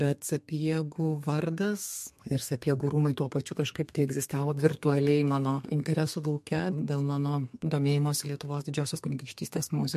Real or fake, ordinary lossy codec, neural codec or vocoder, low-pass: fake; MP3, 64 kbps; codec, 32 kHz, 1.9 kbps, SNAC; 14.4 kHz